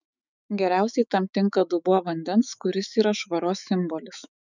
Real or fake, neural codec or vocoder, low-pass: fake; autoencoder, 48 kHz, 128 numbers a frame, DAC-VAE, trained on Japanese speech; 7.2 kHz